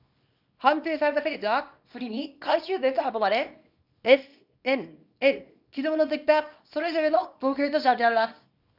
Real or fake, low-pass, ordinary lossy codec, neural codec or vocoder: fake; 5.4 kHz; none; codec, 24 kHz, 0.9 kbps, WavTokenizer, small release